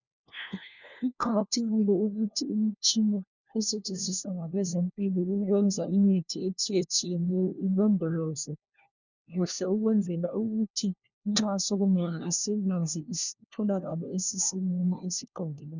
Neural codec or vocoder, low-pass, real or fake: codec, 16 kHz, 1 kbps, FunCodec, trained on LibriTTS, 50 frames a second; 7.2 kHz; fake